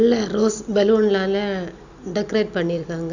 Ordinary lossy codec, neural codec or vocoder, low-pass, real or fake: none; none; 7.2 kHz; real